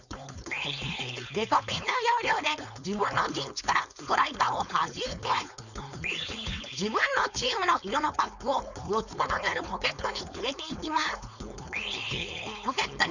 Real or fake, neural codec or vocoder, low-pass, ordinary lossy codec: fake; codec, 16 kHz, 4.8 kbps, FACodec; 7.2 kHz; none